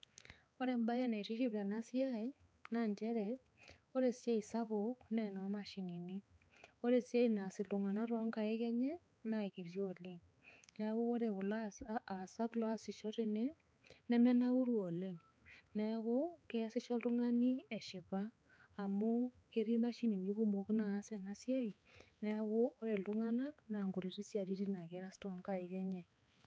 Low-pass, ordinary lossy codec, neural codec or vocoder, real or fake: none; none; codec, 16 kHz, 4 kbps, X-Codec, HuBERT features, trained on balanced general audio; fake